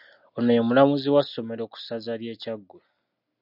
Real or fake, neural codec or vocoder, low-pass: real; none; 5.4 kHz